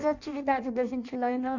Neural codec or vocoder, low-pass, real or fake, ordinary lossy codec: codec, 16 kHz in and 24 kHz out, 0.6 kbps, FireRedTTS-2 codec; 7.2 kHz; fake; none